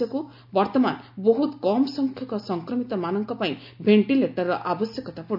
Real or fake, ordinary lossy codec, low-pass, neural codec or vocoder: real; MP3, 48 kbps; 5.4 kHz; none